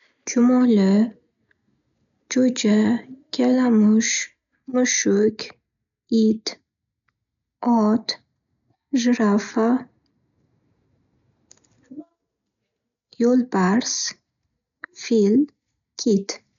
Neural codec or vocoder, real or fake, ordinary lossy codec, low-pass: none; real; none; 7.2 kHz